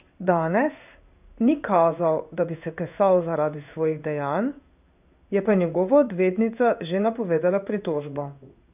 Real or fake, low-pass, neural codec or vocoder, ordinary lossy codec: fake; 3.6 kHz; codec, 16 kHz in and 24 kHz out, 1 kbps, XY-Tokenizer; none